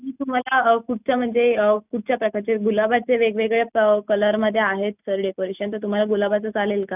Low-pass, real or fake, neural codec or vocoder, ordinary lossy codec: 3.6 kHz; real; none; none